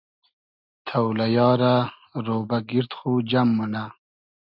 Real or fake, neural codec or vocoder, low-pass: real; none; 5.4 kHz